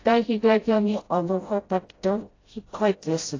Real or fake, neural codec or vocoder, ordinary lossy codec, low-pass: fake; codec, 16 kHz, 0.5 kbps, FreqCodec, smaller model; AAC, 32 kbps; 7.2 kHz